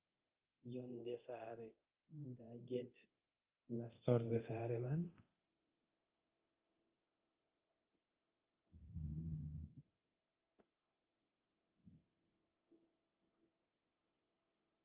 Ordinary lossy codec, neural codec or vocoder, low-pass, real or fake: Opus, 24 kbps; codec, 24 kHz, 0.9 kbps, DualCodec; 3.6 kHz; fake